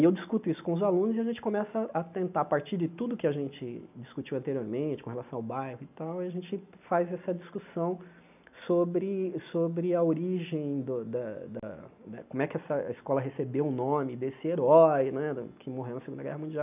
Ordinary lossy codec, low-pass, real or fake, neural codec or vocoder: none; 3.6 kHz; real; none